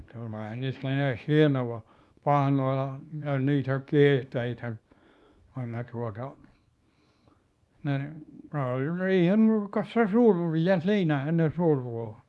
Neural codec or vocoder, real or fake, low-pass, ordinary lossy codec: codec, 24 kHz, 0.9 kbps, WavTokenizer, small release; fake; none; none